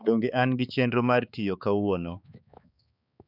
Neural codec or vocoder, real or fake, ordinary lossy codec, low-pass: codec, 16 kHz, 4 kbps, X-Codec, HuBERT features, trained on balanced general audio; fake; none; 5.4 kHz